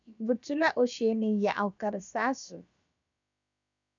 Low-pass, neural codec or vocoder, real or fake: 7.2 kHz; codec, 16 kHz, about 1 kbps, DyCAST, with the encoder's durations; fake